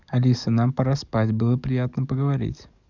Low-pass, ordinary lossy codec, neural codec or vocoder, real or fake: 7.2 kHz; none; codec, 44.1 kHz, 7.8 kbps, DAC; fake